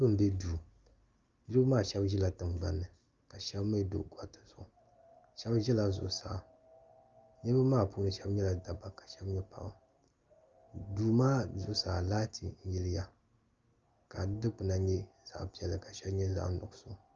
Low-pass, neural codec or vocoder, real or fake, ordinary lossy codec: 7.2 kHz; none; real; Opus, 24 kbps